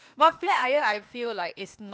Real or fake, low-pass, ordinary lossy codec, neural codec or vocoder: fake; none; none; codec, 16 kHz, 0.8 kbps, ZipCodec